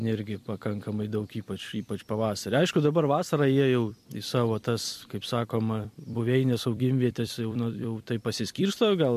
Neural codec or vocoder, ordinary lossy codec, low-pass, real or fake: vocoder, 44.1 kHz, 128 mel bands every 256 samples, BigVGAN v2; MP3, 64 kbps; 14.4 kHz; fake